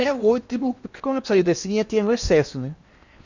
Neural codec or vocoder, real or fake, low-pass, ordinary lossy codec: codec, 16 kHz in and 24 kHz out, 0.8 kbps, FocalCodec, streaming, 65536 codes; fake; 7.2 kHz; Opus, 64 kbps